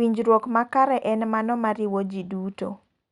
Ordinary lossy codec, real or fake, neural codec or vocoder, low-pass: Opus, 64 kbps; real; none; 10.8 kHz